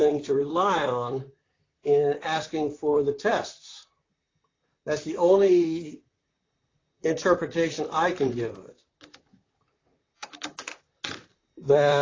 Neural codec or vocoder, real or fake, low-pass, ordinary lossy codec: vocoder, 44.1 kHz, 128 mel bands, Pupu-Vocoder; fake; 7.2 kHz; AAC, 32 kbps